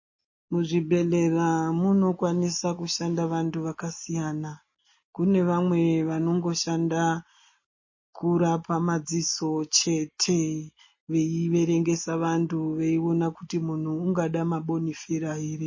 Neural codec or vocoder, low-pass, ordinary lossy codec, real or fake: none; 7.2 kHz; MP3, 32 kbps; real